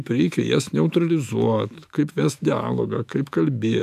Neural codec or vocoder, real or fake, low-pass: autoencoder, 48 kHz, 128 numbers a frame, DAC-VAE, trained on Japanese speech; fake; 14.4 kHz